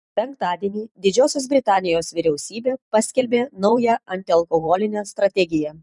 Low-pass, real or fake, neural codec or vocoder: 10.8 kHz; fake; vocoder, 44.1 kHz, 128 mel bands every 512 samples, BigVGAN v2